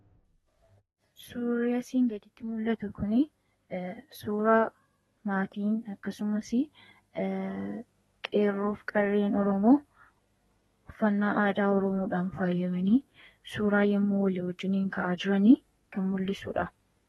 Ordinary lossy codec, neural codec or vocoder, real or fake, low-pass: AAC, 32 kbps; codec, 32 kHz, 1.9 kbps, SNAC; fake; 14.4 kHz